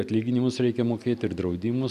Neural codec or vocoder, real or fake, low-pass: none; real; 14.4 kHz